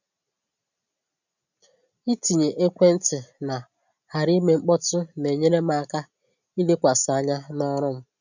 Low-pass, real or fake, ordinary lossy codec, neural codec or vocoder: 7.2 kHz; real; none; none